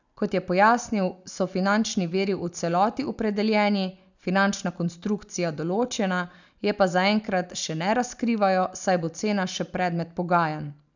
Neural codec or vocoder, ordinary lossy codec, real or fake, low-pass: none; none; real; 7.2 kHz